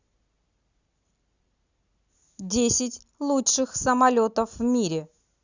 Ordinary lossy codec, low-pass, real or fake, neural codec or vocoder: Opus, 64 kbps; 7.2 kHz; real; none